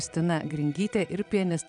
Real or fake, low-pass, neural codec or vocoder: fake; 9.9 kHz; vocoder, 22.05 kHz, 80 mel bands, Vocos